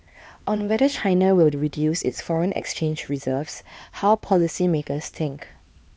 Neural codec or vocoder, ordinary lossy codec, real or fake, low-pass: codec, 16 kHz, 2 kbps, X-Codec, HuBERT features, trained on LibriSpeech; none; fake; none